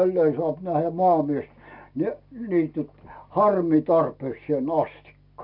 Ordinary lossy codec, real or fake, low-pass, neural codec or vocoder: none; real; 5.4 kHz; none